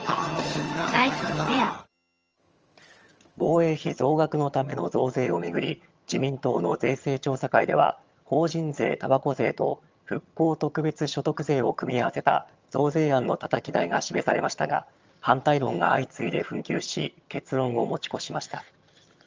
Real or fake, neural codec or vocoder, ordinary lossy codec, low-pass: fake; vocoder, 22.05 kHz, 80 mel bands, HiFi-GAN; Opus, 24 kbps; 7.2 kHz